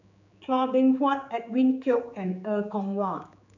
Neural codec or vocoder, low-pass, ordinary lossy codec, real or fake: codec, 16 kHz, 4 kbps, X-Codec, HuBERT features, trained on general audio; 7.2 kHz; none; fake